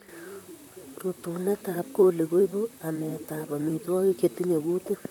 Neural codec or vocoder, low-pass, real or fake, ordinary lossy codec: vocoder, 44.1 kHz, 128 mel bands, Pupu-Vocoder; 19.8 kHz; fake; none